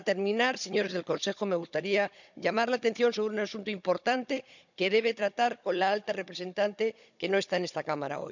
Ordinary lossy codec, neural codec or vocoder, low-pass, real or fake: none; codec, 16 kHz, 16 kbps, FunCodec, trained on Chinese and English, 50 frames a second; 7.2 kHz; fake